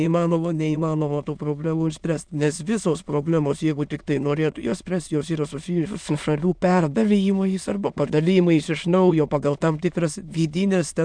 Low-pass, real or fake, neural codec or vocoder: 9.9 kHz; fake; autoencoder, 22.05 kHz, a latent of 192 numbers a frame, VITS, trained on many speakers